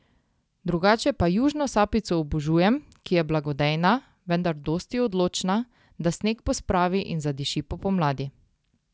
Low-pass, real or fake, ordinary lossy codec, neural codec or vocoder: none; real; none; none